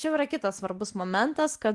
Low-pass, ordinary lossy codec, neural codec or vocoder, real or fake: 10.8 kHz; Opus, 24 kbps; none; real